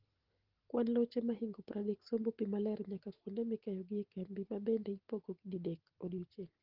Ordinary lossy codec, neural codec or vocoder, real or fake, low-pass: Opus, 16 kbps; none; real; 5.4 kHz